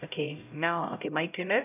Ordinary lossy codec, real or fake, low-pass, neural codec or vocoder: none; fake; 3.6 kHz; codec, 16 kHz, 0.5 kbps, X-Codec, HuBERT features, trained on LibriSpeech